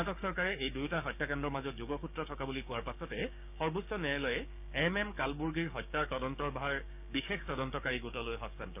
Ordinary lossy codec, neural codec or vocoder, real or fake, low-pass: none; codec, 16 kHz, 6 kbps, DAC; fake; 3.6 kHz